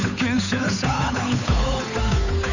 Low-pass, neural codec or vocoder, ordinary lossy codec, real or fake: 7.2 kHz; codec, 16 kHz, 8 kbps, FunCodec, trained on Chinese and English, 25 frames a second; none; fake